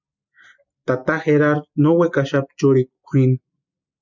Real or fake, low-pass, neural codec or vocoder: real; 7.2 kHz; none